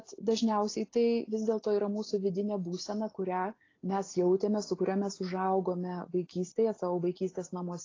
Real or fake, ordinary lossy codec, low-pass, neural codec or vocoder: real; AAC, 32 kbps; 7.2 kHz; none